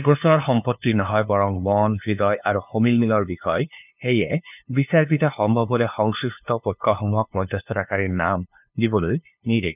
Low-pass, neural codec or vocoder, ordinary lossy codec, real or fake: 3.6 kHz; codec, 16 kHz, 2 kbps, FunCodec, trained on LibriTTS, 25 frames a second; none; fake